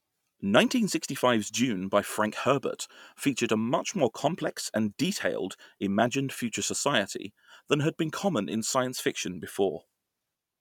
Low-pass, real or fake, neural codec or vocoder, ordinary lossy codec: 19.8 kHz; real; none; none